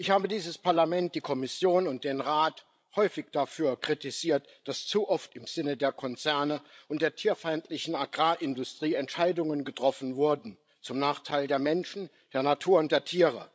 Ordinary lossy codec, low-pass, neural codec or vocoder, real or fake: none; none; codec, 16 kHz, 16 kbps, FreqCodec, larger model; fake